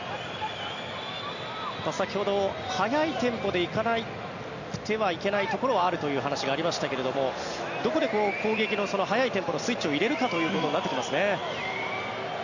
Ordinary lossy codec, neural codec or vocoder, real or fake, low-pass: none; none; real; 7.2 kHz